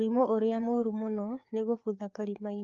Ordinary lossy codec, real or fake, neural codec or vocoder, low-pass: Opus, 32 kbps; fake; codec, 16 kHz, 4 kbps, FreqCodec, larger model; 7.2 kHz